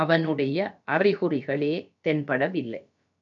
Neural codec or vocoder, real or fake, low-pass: codec, 16 kHz, about 1 kbps, DyCAST, with the encoder's durations; fake; 7.2 kHz